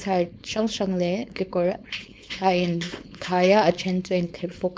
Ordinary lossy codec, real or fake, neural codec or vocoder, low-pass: none; fake; codec, 16 kHz, 4.8 kbps, FACodec; none